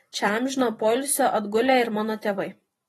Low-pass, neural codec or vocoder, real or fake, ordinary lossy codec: 19.8 kHz; vocoder, 44.1 kHz, 128 mel bands every 256 samples, BigVGAN v2; fake; AAC, 32 kbps